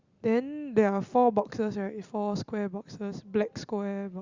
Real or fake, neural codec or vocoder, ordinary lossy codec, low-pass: real; none; Opus, 64 kbps; 7.2 kHz